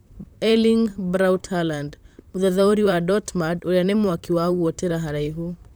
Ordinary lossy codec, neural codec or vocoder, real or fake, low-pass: none; vocoder, 44.1 kHz, 128 mel bands, Pupu-Vocoder; fake; none